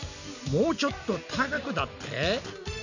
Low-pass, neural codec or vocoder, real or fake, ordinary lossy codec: 7.2 kHz; none; real; none